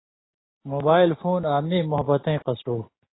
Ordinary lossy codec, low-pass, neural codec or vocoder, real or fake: AAC, 16 kbps; 7.2 kHz; none; real